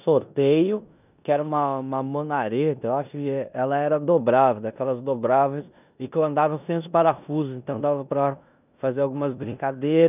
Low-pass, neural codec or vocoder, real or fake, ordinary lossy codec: 3.6 kHz; codec, 16 kHz in and 24 kHz out, 0.9 kbps, LongCat-Audio-Codec, four codebook decoder; fake; none